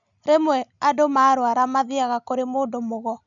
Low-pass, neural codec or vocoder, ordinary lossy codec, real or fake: 7.2 kHz; none; none; real